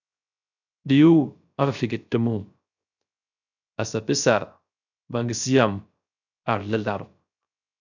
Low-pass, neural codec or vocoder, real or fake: 7.2 kHz; codec, 16 kHz, 0.3 kbps, FocalCodec; fake